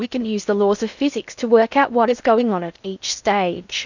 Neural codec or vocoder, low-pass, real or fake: codec, 16 kHz in and 24 kHz out, 0.6 kbps, FocalCodec, streaming, 2048 codes; 7.2 kHz; fake